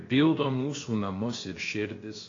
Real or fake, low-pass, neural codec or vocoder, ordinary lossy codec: fake; 7.2 kHz; codec, 16 kHz, about 1 kbps, DyCAST, with the encoder's durations; AAC, 32 kbps